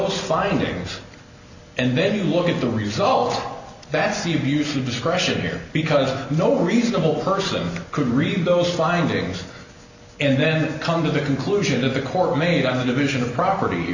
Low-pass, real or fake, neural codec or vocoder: 7.2 kHz; real; none